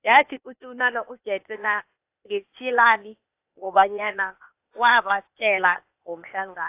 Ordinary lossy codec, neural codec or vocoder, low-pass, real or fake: AAC, 32 kbps; codec, 16 kHz, 0.8 kbps, ZipCodec; 3.6 kHz; fake